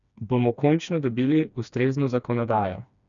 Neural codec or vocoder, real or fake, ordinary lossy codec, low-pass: codec, 16 kHz, 2 kbps, FreqCodec, smaller model; fake; none; 7.2 kHz